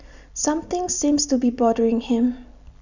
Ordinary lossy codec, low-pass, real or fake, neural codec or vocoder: none; 7.2 kHz; real; none